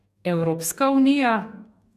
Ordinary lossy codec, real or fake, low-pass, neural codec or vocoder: none; fake; 14.4 kHz; codec, 44.1 kHz, 2.6 kbps, DAC